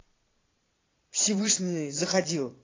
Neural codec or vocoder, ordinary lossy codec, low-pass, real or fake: vocoder, 22.05 kHz, 80 mel bands, Vocos; AAC, 32 kbps; 7.2 kHz; fake